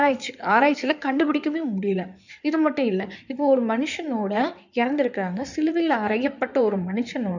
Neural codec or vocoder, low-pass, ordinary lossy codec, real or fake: codec, 16 kHz in and 24 kHz out, 2.2 kbps, FireRedTTS-2 codec; 7.2 kHz; none; fake